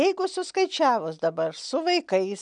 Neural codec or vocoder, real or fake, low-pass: none; real; 9.9 kHz